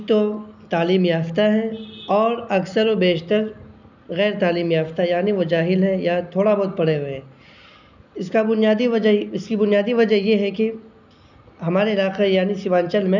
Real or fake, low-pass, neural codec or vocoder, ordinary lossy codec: real; 7.2 kHz; none; none